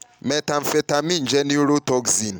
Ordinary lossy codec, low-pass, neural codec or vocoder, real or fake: none; none; none; real